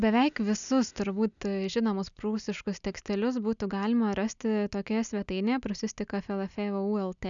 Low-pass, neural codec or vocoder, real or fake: 7.2 kHz; none; real